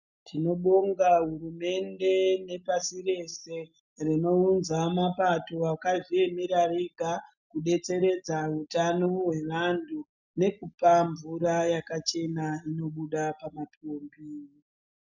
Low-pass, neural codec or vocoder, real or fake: 7.2 kHz; none; real